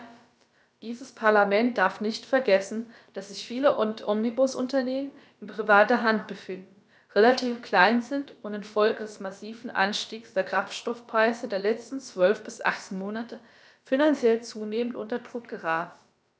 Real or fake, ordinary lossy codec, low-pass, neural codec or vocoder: fake; none; none; codec, 16 kHz, about 1 kbps, DyCAST, with the encoder's durations